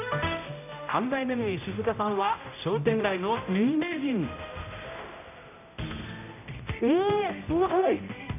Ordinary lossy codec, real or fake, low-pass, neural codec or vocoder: none; fake; 3.6 kHz; codec, 16 kHz, 0.5 kbps, X-Codec, HuBERT features, trained on general audio